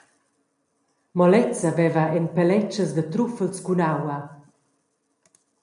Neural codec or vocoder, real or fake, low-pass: none; real; 10.8 kHz